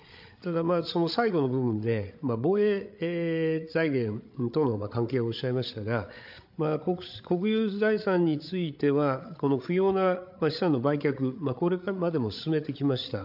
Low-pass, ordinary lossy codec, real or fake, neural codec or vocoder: 5.4 kHz; AAC, 48 kbps; fake; codec, 16 kHz, 8 kbps, FreqCodec, larger model